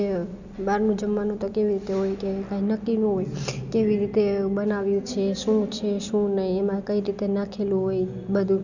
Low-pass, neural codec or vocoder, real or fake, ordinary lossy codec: 7.2 kHz; none; real; none